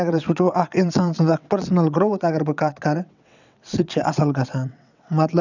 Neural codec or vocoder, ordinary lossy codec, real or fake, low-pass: vocoder, 22.05 kHz, 80 mel bands, WaveNeXt; none; fake; 7.2 kHz